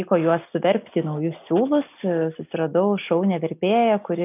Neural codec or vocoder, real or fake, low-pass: none; real; 3.6 kHz